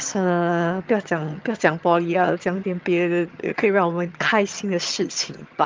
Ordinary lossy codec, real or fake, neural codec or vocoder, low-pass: Opus, 24 kbps; fake; vocoder, 22.05 kHz, 80 mel bands, HiFi-GAN; 7.2 kHz